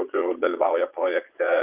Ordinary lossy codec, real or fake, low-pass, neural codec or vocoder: Opus, 24 kbps; fake; 3.6 kHz; vocoder, 22.05 kHz, 80 mel bands, Vocos